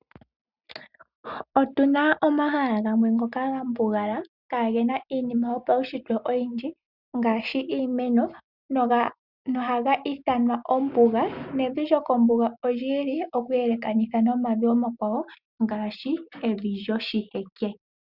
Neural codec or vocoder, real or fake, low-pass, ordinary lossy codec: none; real; 5.4 kHz; Opus, 64 kbps